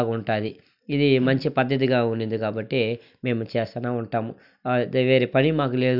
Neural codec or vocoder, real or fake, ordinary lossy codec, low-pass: none; real; none; 5.4 kHz